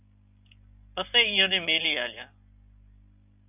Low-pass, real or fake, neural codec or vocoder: 3.6 kHz; real; none